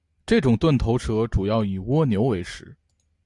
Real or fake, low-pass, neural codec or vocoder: real; 10.8 kHz; none